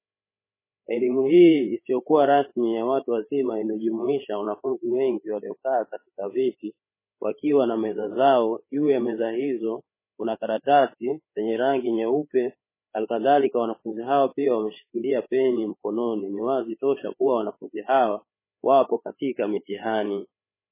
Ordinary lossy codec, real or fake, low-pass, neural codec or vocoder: MP3, 16 kbps; fake; 3.6 kHz; codec, 16 kHz, 8 kbps, FreqCodec, larger model